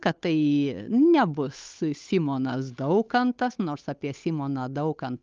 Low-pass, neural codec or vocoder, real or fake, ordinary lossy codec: 7.2 kHz; codec, 16 kHz, 8 kbps, FunCodec, trained on Chinese and English, 25 frames a second; fake; Opus, 24 kbps